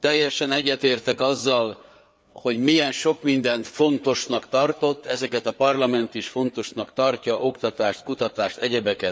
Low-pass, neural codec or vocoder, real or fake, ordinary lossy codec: none; codec, 16 kHz, 4 kbps, FreqCodec, larger model; fake; none